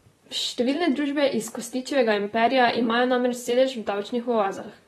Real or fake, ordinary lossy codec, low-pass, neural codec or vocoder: fake; AAC, 32 kbps; 19.8 kHz; vocoder, 44.1 kHz, 128 mel bands, Pupu-Vocoder